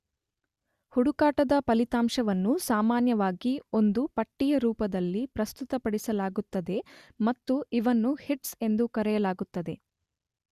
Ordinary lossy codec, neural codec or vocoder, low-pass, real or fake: Opus, 64 kbps; none; 14.4 kHz; real